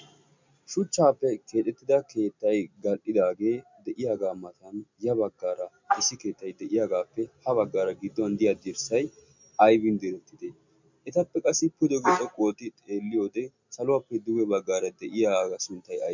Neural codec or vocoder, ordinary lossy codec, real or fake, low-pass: none; MP3, 64 kbps; real; 7.2 kHz